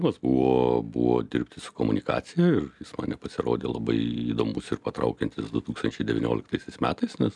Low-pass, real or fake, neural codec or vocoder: 10.8 kHz; real; none